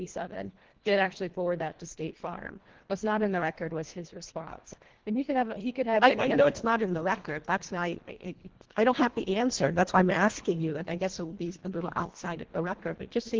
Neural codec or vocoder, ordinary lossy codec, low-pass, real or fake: codec, 24 kHz, 1.5 kbps, HILCodec; Opus, 16 kbps; 7.2 kHz; fake